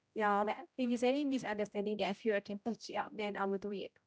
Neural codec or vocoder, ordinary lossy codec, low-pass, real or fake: codec, 16 kHz, 0.5 kbps, X-Codec, HuBERT features, trained on general audio; none; none; fake